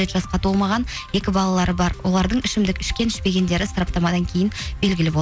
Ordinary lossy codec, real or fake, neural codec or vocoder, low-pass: none; real; none; none